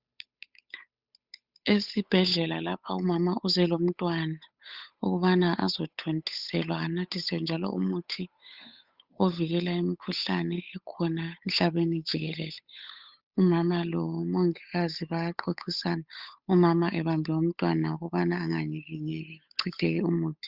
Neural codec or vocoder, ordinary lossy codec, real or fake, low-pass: codec, 16 kHz, 8 kbps, FunCodec, trained on Chinese and English, 25 frames a second; Opus, 64 kbps; fake; 5.4 kHz